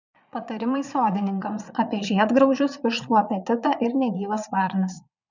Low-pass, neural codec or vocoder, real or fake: 7.2 kHz; vocoder, 22.05 kHz, 80 mel bands, Vocos; fake